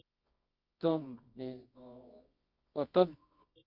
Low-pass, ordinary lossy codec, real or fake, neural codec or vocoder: 5.4 kHz; Opus, 64 kbps; fake; codec, 24 kHz, 0.9 kbps, WavTokenizer, medium music audio release